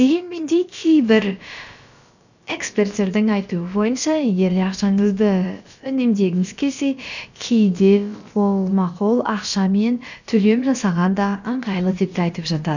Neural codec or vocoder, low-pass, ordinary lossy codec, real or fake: codec, 16 kHz, about 1 kbps, DyCAST, with the encoder's durations; 7.2 kHz; none; fake